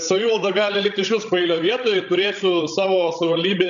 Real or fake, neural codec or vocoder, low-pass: fake; codec, 16 kHz, 16 kbps, FreqCodec, larger model; 7.2 kHz